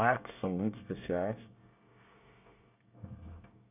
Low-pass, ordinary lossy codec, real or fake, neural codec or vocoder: 3.6 kHz; none; fake; codec, 24 kHz, 1 kbps, SNAC